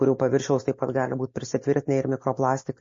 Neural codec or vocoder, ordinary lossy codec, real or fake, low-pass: none; MP3, 32 kbps; real; 7.2 kHz